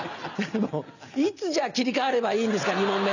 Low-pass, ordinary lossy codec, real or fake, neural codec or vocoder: 7.2 kHz; none; real; none